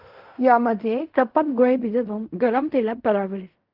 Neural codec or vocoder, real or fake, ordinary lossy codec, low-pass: codec, 16 kHz in and 24 kHz out, 0.4 kbps, LongCat-Audio-Codec, fine tuned four codebook decoder; fake; Opus, 32 kbps; 5.4 kHz